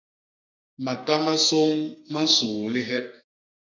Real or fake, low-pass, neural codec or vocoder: fake; 7.2 kHz; codec, 32 kHz, 1.9 kbps, SNAC